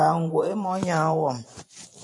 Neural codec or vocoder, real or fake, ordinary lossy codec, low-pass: vocoder, 44.1 kHz, 128 mel bands every 512 samples, BigVGAN v2; fake; MP3, 48 kbps; 10.8 kHz